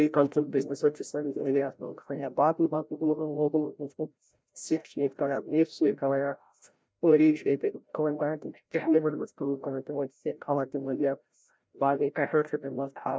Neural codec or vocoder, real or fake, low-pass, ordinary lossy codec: codec, 16 kHz, 0.5 kbps, FreqCodec, larger model; fake; none; none